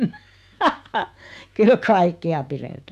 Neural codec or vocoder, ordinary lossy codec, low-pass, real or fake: autoencoder, 48 kHz, 128 numbers a frame, DAC-VAE, trained on Japanese speech; none; 14.4 kHz; fake